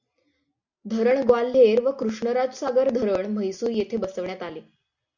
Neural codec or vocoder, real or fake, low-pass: none; real; 7.2 kHz